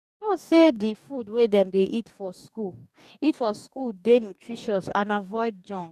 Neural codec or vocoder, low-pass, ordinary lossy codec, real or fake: codec, 44.1 kHz, 2.6 kbps, DAC; 14.4 kHz; none; fake